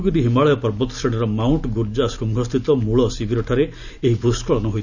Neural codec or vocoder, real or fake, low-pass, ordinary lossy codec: none; real; 7.2 kHz; none